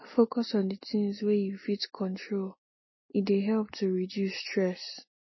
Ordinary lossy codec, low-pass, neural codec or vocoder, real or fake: MP3, 24 kbps; 7.2 kHz; none; real